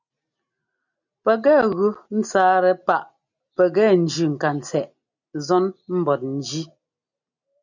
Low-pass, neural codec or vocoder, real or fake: 7.2 kHz; none; real